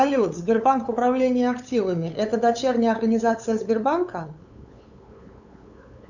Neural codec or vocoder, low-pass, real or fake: codec, 16 kHz, 8 kbps, FunCodec, trained on LibriTTS, 25 frames a second; 7.2 kHz; fake